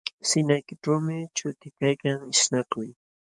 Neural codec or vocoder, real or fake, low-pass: codec, 44.1 kHz, 7.8 kbps, DAC; fake; 10.8 kHz